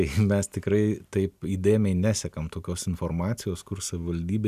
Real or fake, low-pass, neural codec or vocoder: real; 14.4 kHz; none